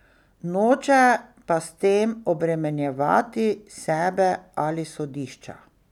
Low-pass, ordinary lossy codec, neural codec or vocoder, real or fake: 19.8 kHz; none; none; real